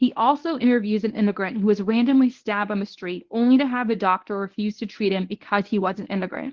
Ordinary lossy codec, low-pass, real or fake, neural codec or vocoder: Opus, 16 kbps; 7.2 kHz; fake; codec, 24 kHz, 0.9 kbps, WavTokenizer, medium speech release version 1